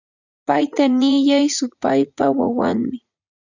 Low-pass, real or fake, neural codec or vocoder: 7.2 kHz; fake; vocoder, 44.1 kHz, 80 mel bands, Vocos